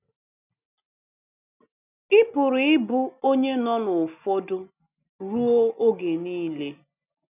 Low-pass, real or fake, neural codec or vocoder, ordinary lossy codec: 3.6 kHz; real; none; none